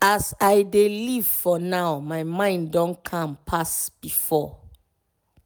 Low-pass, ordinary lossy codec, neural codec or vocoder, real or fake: none; none; none; real